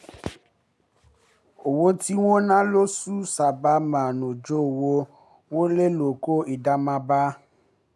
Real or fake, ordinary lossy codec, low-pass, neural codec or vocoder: real; none; none; none